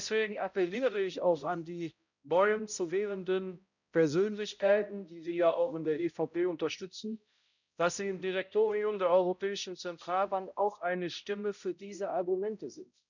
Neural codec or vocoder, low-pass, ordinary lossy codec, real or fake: codec, 16 kHz, 0.5 kbps, X-Codec, HuBERT features, trained on balanced general audio; 7.2 kHz; none; fake